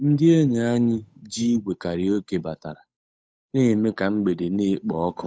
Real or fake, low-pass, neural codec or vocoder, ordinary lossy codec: fake; none; codec, 16 kHz, 8 kbps, FunCodec, trained on Chinese and English, 25 frames a second; none